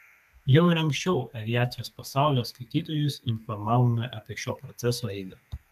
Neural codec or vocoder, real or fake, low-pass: codec, 32 kHz, 1.9 kbps, SNAC; fake; 14.4 kHz